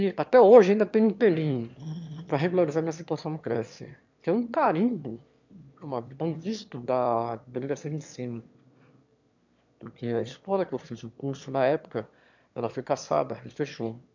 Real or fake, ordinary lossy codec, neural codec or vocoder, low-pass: fake; MP3, 64 kbps; autoencoder, 22.05 kHz, a latent of 192 numbers a frame, VITS, trained on one speaker; 7.2 kHz